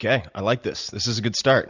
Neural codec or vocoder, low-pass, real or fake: none; 7.2 kHz; real